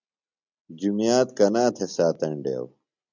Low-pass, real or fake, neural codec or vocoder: 7.2 kHz; real; none